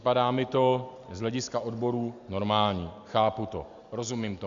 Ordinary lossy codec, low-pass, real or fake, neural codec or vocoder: Opus, 64 kbps; 7.2 kHz; real; none